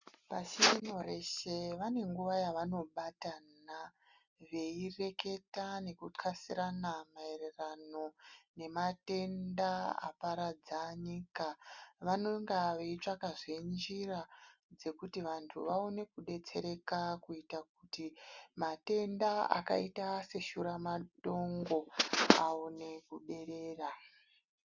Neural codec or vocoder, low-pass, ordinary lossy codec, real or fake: none; 7.2 kHz; Opus, 64 kbps; real